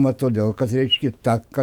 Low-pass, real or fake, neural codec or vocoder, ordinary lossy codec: 19.8 kHz; fake; autoencoder, 48 kHz, 128 numbers a frame, DAC-VAE, trained on Japanese speech; Opus, 64 kbps